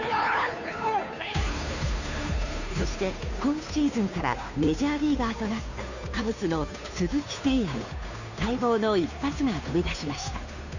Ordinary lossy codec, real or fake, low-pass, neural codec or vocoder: none; fake; 7.2 kHz; codec, 16 kHz, 2 kbps, FunCodec, trained on Chinese and English, 25 frames a second